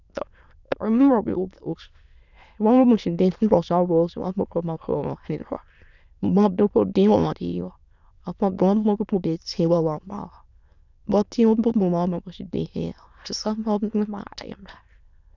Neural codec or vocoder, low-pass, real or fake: autoencoder, 22.05 kHz, a latent of 192 numbers a frame, VITS, trained on many speakers; 7.2 kHz; fake